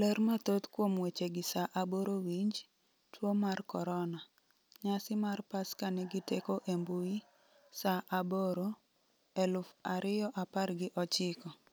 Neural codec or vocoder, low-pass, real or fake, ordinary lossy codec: none; none; real; none